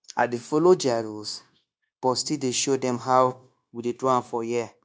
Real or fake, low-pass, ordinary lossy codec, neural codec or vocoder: fake; none; none; codec, 16 kHz, 0.9 kbps, LongCat-Audio-Codec